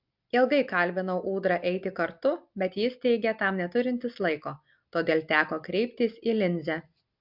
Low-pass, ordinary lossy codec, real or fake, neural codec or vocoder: 5.4 kHz; MP3, 48 kbps; real; none